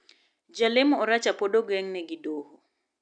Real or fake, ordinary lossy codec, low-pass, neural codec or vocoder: real; none; 9.9 kHz; none